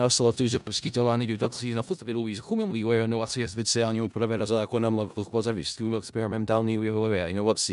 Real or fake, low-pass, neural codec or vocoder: fake; 10.8 kHz; codec, 16 kHz in and 24 kHz out, 0.4 kbps, LongCat-Audio-Codec, four codebook decoder